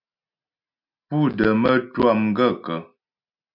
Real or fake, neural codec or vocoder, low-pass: real; none; 5.4 kHz